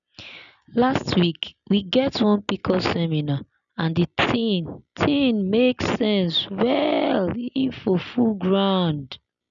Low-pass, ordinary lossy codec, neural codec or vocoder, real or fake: 7.2 kHz; none; none; real